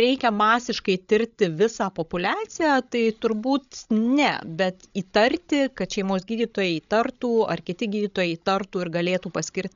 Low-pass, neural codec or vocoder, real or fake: 7.2 kHz; codec, 16 kHz, 16 kbps, FreqCodec, larger model; fake